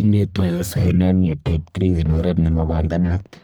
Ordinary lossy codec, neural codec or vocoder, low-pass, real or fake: none; codec, 44.1 kHz, 1.7 kbps, Pupu-Codec; none; fake